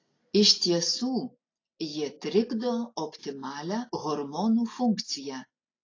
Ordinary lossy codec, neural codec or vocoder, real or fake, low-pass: AAC, 32 kbps; none; real; 7.2 kHz